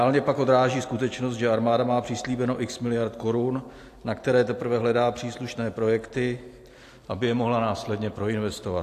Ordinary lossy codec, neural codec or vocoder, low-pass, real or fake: AAC, 64 kbps; none; 14.4 kHz; real